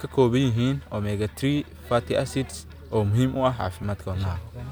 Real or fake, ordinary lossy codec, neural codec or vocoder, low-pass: real; none; none; none